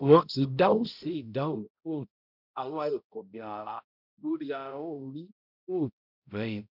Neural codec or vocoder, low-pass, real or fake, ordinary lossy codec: codec, 16 kHz, 0.5 kbps, X-Codec, HuBERT features, trained on balanced general audio; 5.4 kHz; fake; none